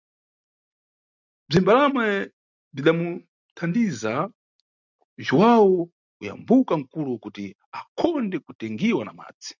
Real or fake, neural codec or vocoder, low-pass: real; none; 7.2 kHz